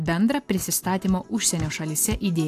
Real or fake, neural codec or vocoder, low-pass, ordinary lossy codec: real; none; 14.4 kHz; AAC, 64 kbps